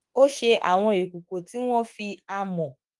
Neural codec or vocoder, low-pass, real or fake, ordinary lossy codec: autoencoder, 48 kHz, 32 numbers a frame, DAC-VAE, trained on Japanese speech; 10.8 kHz; fake; Opus, 24 kbps